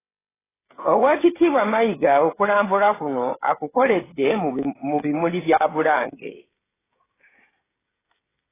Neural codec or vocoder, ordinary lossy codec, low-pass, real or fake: codec, 16 kHz, 16 kbps, FreqCodec, smaller model; AAC, 16 kbps; 3.6 kHz; fake